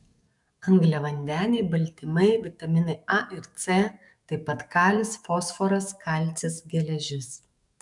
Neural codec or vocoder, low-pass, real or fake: codec, 44.1 kHz, 7.8 kbps, DAC; 10.8 kHz; fake